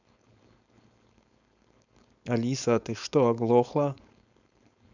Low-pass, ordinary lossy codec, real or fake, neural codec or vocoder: 7.2 kHz; none; fake; codec, 16 kHz, 4.8 kbps, FACodec